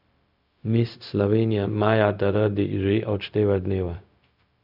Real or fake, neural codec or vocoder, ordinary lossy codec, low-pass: fake; codec, 16 kHz, 0.4 kbps, LongCat-Audio-Codec; none; 5.4 kHz